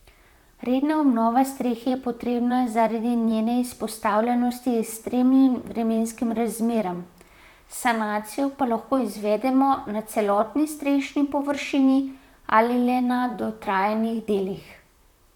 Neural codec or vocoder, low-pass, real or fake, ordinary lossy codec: vocoder, 44.1 kHz, 128 mel bands, Pupu-Vocoder; 19.8 kHz; fake; none